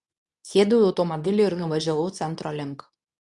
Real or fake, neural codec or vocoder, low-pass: fake; codec, 24 kHz, 0.9 kbps, WavTokenizer, medium speech release version 2; 10.8 kHz